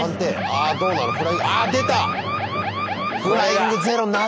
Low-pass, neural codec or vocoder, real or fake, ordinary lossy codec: none; none; real; none